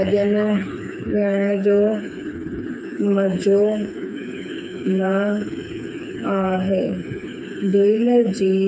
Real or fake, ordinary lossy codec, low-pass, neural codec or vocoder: fake; none; none; codec, 16 kHz, 4 kbps, FreqCodec, smaller model